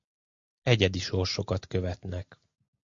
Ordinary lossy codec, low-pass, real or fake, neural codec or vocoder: MP3, 48 kbps; 7.2 kHz; real; none